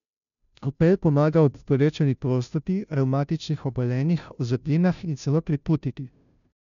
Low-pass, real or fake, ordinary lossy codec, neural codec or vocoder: 7.2 kHz; fake; none; codec, 16 kHz, 0.5 kbps, FunCodec, trained on Chinese and English, 25 frames a second